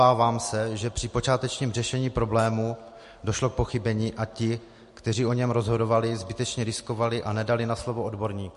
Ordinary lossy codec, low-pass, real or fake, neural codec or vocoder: MP3, 48 kbps; 14.4 kHz; real; none